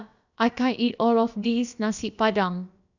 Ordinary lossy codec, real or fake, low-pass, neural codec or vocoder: none; fake; 7.2 kHz; codec, 16 kHz, about 1 kbps, DyCAST, with the encoder's durations